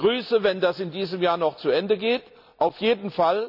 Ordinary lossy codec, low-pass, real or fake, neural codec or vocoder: none; 5.4 kHz; real; none